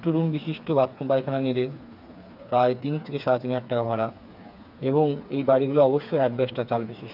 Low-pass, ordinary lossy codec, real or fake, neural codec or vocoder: 5.4 kHz; none; fake; codec, 16 kHz, 4 kbps, FreqCodec, smaller model